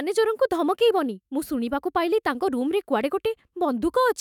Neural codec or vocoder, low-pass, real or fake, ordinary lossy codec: autoencoder, 48 kHz, 128 numbers a frame, DAC-VAE, trained on Japanese speech; 19.8 kHz; fake; none